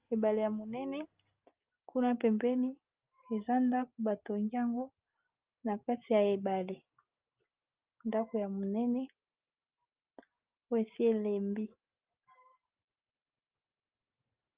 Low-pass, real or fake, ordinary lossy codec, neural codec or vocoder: 3.6 kHz; real; Opus, 24 kbps; none